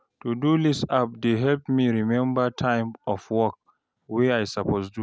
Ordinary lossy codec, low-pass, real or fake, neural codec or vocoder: none; none; real; none